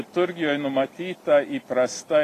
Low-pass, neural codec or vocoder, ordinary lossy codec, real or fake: 14.4 kHz; none; AAC, 48 kbps; real